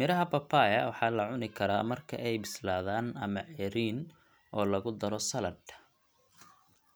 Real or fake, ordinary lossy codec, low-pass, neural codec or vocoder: real; none; none; none